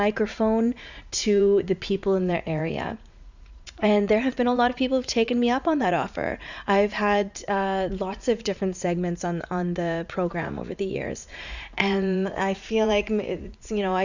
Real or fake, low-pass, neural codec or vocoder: fake; 7.2 kHz; vocoder, 44.1 kHz, 80 mel bands, Vocos